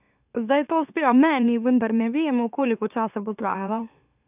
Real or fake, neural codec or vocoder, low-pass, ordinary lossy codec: fake; autoencoder, 44.1 kHz, a latent of 192 numbers a frame, MeloTTS; 3.6 kHz; none